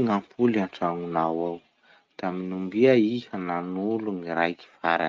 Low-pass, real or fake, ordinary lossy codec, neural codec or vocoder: 7.2 kHz; real; Opus, 24 kbps; none